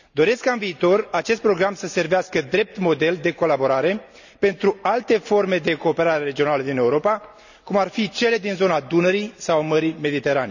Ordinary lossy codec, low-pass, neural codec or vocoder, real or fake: none; 7.2 kHz; none; real